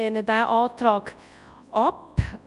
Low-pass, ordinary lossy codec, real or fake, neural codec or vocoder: 10.8 kHz; none; fake; codec, 24 kHz, 0.9 kbps, WavTokenizer, large speech release